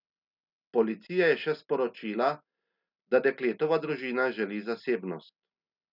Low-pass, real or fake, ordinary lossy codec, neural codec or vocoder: 5.4 kHz; real; none; none